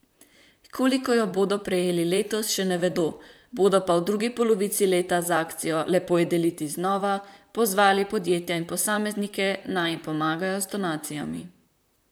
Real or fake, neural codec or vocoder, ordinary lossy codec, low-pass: fake; vocoder, 44.1 kHz, 128 mel bands, Pupu-Vocoder; none; none